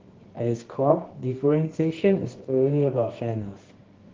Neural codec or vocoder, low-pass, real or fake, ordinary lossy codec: codec, 24 kHz, 0.9 kbps, WavTokenizer, medium music audio release; 7.2 kHz; fake; Opus, 16 kbps